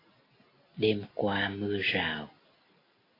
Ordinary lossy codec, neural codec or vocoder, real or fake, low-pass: AAC, 24 kbps; none; real; 5.4 kHz